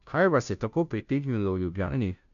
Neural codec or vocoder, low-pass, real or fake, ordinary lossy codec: codec, 16 kHz, 0.5 kbps, FunCodec, trained on Chinese and English, 25 frames a second; 7.2 kHz; fake; none